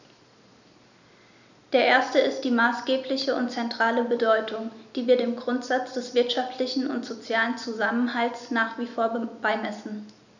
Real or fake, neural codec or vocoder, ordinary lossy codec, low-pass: real; none; none; 7.2 kHz